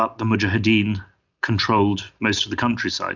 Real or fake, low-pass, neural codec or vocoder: real; 7.2 kHz; none